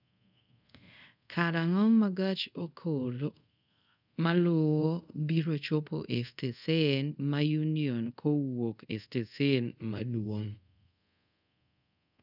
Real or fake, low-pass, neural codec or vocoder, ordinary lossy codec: fake; 5.4 kHz; codec, 24 kHz, 0.5 kbps, DualCodec; none